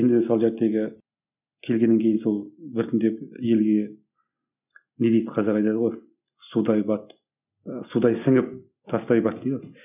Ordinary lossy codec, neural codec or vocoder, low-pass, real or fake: none; none; 3.6 kHz; real